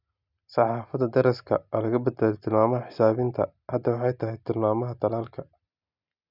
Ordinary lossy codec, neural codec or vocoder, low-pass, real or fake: none; none; 5.4 kHz; real